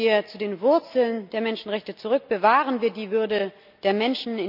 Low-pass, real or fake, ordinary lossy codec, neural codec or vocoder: 5.4 kHz; real; none; none